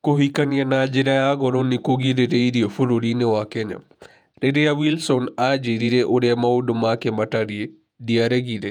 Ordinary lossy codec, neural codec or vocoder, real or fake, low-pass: none; vocoder, 48 kHz, 128 mel bands, Vocos; fake; 19.8 kHz